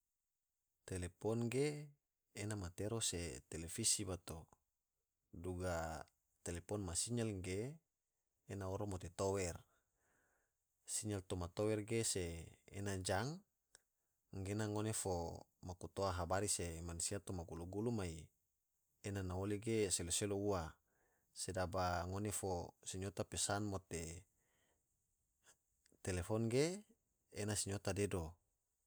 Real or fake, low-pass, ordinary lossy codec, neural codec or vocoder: real; none; none; none